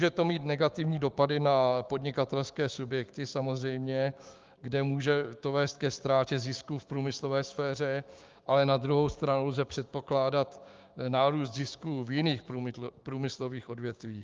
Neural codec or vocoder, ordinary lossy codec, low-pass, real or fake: codec, 16 kHz, 6 kbps, DAC; Opus, 32 kbps; 7.2 kHz; fake